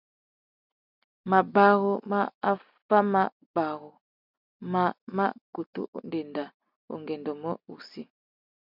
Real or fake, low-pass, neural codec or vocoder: real; 5.4 kHz; none